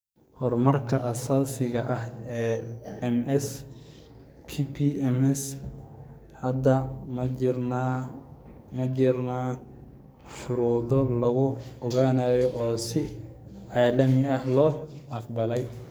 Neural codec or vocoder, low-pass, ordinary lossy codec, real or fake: codec, 44.1 kHz, 2.6 kbps, SNAC; none; none; fake